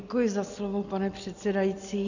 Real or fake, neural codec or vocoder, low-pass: real; none; 7.2 kHz